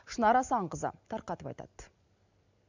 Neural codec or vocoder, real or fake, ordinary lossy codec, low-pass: none; real; none; 7.2 kHz